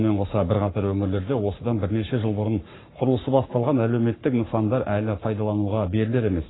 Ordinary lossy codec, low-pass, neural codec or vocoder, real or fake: AAC, 16 kbps; 7.2 kHz; codec, 44.1 kHz, 7.8 kbps, Pupu-Codec; fake